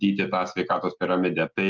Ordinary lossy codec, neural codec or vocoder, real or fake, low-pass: Opus, 32 kbps; none; real; 7.2 kHz